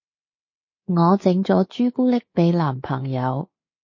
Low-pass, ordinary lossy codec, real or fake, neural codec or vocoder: 7.2 kHz; MP3, 32 kbps; fake; codec, 24 kHz, 3.1 kbps, DualCodec